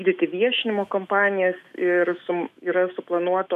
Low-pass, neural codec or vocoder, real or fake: 14.4 kHz; autoencoder, 48 kHz, 128 numbers a frame, DAC-VAE, trained on Japanese speech; fake